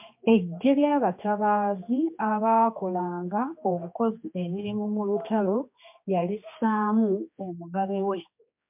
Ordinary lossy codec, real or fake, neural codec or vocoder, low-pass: MP3, 32 kbps; fake; codec, 16 kHz, 2 kbps, X-Codec, HuBERT features, trained on general audio; 3.6 kHz